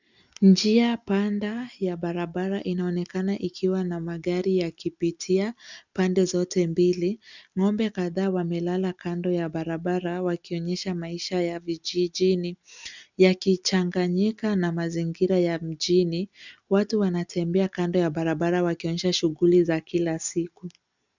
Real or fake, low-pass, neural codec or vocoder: real; 7.2 kHz; none